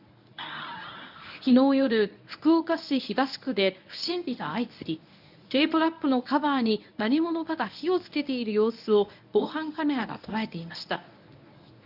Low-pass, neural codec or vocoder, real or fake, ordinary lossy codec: 5.4 kHz; codec, 24 kHz, 0.9 kbps, WavTokenizer, medium speech release version 1; fake; none